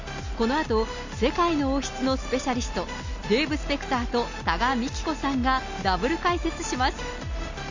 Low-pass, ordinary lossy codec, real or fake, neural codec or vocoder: 7.2 kHz; Opus, 64 kbps; real; none